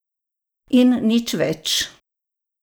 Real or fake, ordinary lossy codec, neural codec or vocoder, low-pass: real; none; none; none